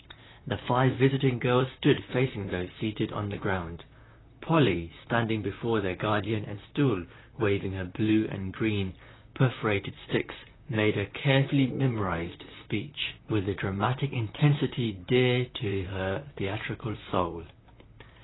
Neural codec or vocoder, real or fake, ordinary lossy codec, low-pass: vocoder, 44.1 kHz, 128 mel bands, Pupu-Vocoder; fake; AAC, 16 kbps; 7.2 kHz